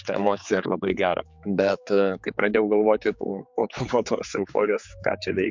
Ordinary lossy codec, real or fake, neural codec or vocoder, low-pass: MP3, 64 kbps; fake; codec, 16 kHz, 4 kbps, X-Codec, HuBERT features, trained on general audio; 7.2 kHz